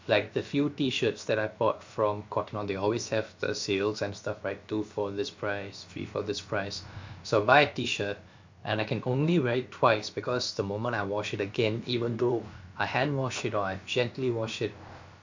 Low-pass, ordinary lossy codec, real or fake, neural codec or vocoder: 7.2 kHz; MP3, 48 kbps; fake; codec, 16 kHz, about 1 kbps, DyCAST, with the encoder's durations